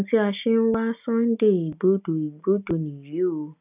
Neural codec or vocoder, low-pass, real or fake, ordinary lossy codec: none; 3.6 kHz; real; none